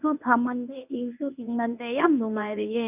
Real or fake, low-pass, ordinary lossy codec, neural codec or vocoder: fake; 3.6 kHz; none; codec, 24 kHz, 0.9 kbps, WavTokenizer, medium speech release version 1